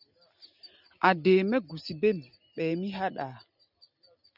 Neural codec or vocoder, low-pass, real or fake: none; 5.4 kHz; real